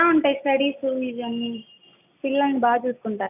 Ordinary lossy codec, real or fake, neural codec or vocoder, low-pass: none; real; none; 3.6 kHz